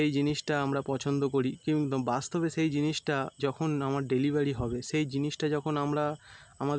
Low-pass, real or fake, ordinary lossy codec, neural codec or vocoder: none; real; none; none